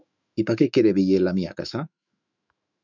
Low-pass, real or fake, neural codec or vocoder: 7.2 kHz; fake; autoencoder, 48 kHz, 128 numbers a frame, DAC-VAE, trained on Japanese speech